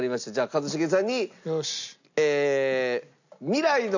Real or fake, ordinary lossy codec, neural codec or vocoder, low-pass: real; none; none; 7.2 kHz